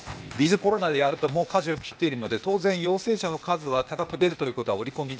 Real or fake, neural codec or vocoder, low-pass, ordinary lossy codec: fake; codec, 16 kHz, 0.8 kbps, ZipCodec; none; none